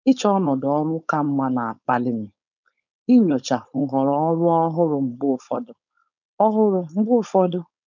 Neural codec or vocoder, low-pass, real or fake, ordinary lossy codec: codec, 16 kHz, 4.8 kbps, FACodec; 7.2 kHz; fake; none